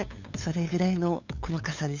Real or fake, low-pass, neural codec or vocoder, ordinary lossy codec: fake; 7.2 kHz; codec, 16 kHz, 8 kbps, FunCodec, trained on LibriTTS, 25 frames a second; none